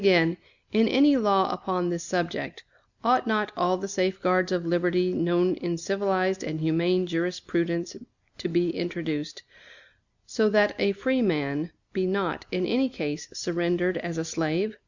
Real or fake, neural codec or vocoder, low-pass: real; none; 7.2 kHz